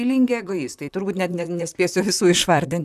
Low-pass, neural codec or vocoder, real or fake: 14.4 kHz; vocoder, 44.1 kHz, 128 mel bands, Pupu-Vocoder; fake